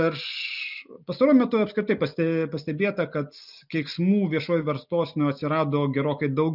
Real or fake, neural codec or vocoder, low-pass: real; none; 5.4 kHz